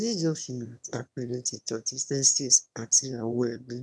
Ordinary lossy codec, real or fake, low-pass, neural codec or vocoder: none; fake; none; autoencoder, 22.05 kHz, a latent of 192 numbers a frame, VITS, trained on one speaker